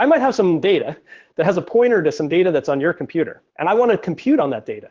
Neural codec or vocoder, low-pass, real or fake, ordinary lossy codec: codec, 16 kHz in and 24 kHz out, 1 kbps, XY-Tokenizer; 7.2 kHz; fake; Opus, 16 kbps